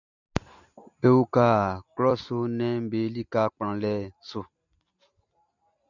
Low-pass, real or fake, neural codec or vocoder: 7.2 kHz; real; none